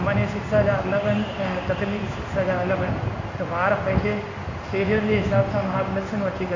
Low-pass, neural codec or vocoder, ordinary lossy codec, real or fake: 7.2 kHz; codec, 16 kHz in and 24 kHz out, 1 kbps, XY-Tokenizer; AAC, 48 kbps; fake